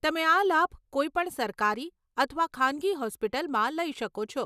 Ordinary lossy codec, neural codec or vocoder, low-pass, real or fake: none; none; 14.4 kHz; real